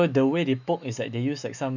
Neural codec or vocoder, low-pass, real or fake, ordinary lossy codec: autoencoder, 48 kHz, 128 numbers a frame, DAC-VAE, trained on Japanese speech; 7.2 kHz; fake; none